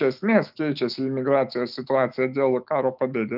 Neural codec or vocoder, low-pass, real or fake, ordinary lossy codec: autoencoder, 48 kHz, 128 numbers a frame, DAC-VAE, trained on Japanese speech; 5.4 kHz; fake; Opus, 32 kbps